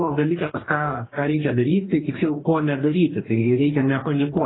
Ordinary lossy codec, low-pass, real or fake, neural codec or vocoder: AAC, 16 kbps; 7.2 kHz; fake; codec, 44.1 kHz, 2.6 kbps, DAC